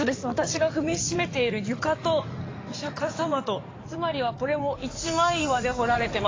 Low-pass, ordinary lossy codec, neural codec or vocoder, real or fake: 7.2 kHz; AAC, 32 kbps; codec, 16 kHz in and 24 kHz out, 2.2 kbps, FireRedTTS-2 codec; fake